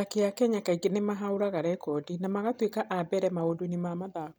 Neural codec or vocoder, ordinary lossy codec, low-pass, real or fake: none; none; none; real